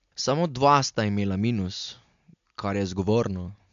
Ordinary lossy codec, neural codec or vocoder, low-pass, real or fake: MP3, 64 kbps; none; 7.2 kHz; real